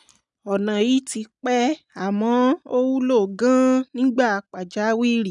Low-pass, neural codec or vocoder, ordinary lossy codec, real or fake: 10.8 kHz; none; none; real